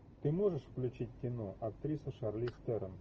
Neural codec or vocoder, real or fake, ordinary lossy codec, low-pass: none; real; Opus, 24 kbps; 7.2 kHz